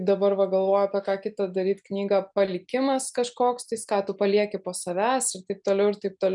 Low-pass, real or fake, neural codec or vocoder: 10.8 kHz; real; none